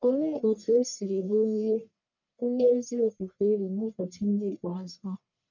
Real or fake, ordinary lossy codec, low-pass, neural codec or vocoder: fake; none; 7.2 kHz; codec, 44.1 kHz, 1.7 kbps, Pupu-Codec